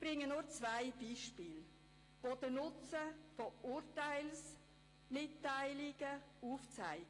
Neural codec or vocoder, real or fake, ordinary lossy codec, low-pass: none; real; AAC, 32 kbps; 10.8 kHz